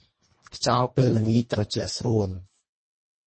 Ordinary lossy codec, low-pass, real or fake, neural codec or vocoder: MP3, 32 kbps; 9.9 kHz; fake; codec, 24 kHz, 1.5 kbps, HILCodec